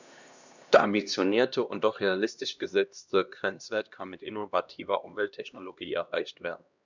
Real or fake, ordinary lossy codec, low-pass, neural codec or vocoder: fake; none; 7.2 kHz; codec, 16 kHz, 1 kbps, X-Codec, HuBERT features, trained on LibriSpeech